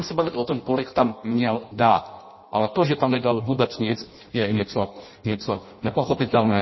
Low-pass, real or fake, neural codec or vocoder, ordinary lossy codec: 7.2 kHz; fake; codec, 16 kHz in and 24 kHz out, 0.6 kbps, FireRedTTS-2 codec; MP3, 24 kbps